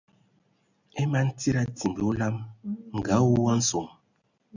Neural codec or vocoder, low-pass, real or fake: none; 7.2 kHz; real